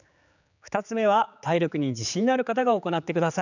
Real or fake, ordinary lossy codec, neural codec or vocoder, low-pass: fake; none; codec, 16 kHz, 4 kbps, X-Codec, HuBERT features, trained on general audio; 7.2 kHz